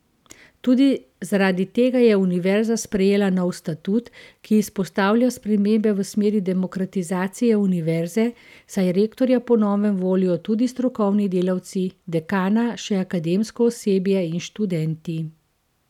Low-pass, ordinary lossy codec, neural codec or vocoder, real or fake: 19.8 kHz; none; none; real